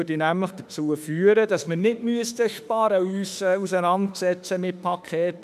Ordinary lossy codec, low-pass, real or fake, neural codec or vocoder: none; 14.4 kHz; fake; autoencoder, 48 kHz, 32 numbers a frame, DAC-VAE, trained on Japanese speech